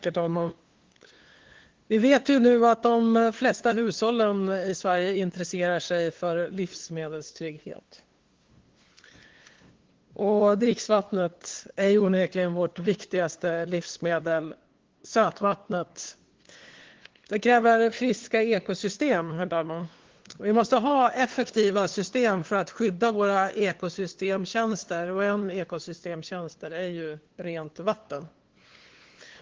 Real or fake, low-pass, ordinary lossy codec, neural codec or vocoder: fake; 7.2 kHz; Opus, 16 kbps; codec, 16 kHz, 2 kbps, FunCodec, trained on LibriTTS, 25 frames a second